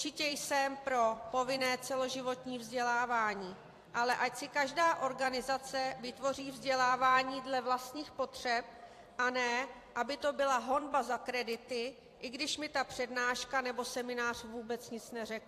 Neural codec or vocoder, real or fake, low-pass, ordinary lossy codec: vocoder, 44.1 kHz, 128 mel bands every 256 samples, BigVGAN v2; fake; 14.4 kHz; AAC, 64 kbps